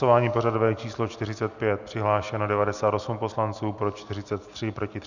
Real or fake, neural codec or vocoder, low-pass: real; none; 7.2 kHz